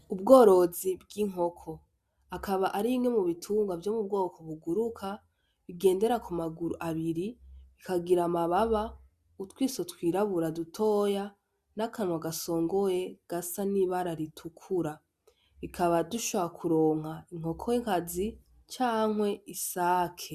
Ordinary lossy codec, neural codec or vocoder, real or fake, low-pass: Opus, 64 kbps; none; real; 14.4 kHz